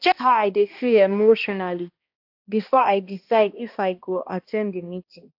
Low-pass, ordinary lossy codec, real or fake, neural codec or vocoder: 5.4 kHz; AAC, 48 kbps; fake; codec, 16 kHz, 1 kbps, X-Codec, HuBERT features, trained on balanced general audio